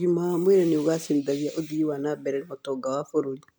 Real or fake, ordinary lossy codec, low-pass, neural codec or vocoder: real; none; none; none